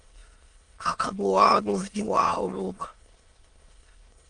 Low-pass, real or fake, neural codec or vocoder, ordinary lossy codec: 9.9 kHz; fake; autoencoder, 22.05 kHz, a latent of 192 numbers a frame, VITS, trained on many speakers; Opus, 32 kbps